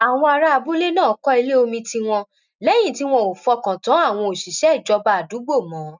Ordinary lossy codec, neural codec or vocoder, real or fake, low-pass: none; none; real; 7.2 kHz